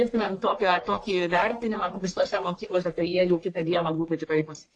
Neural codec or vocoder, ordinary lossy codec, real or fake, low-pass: codec, 44.1 kHz, 1.7 kbps, Pupu-Codec; AAC, 48 kbps; fake; 9.9 kHz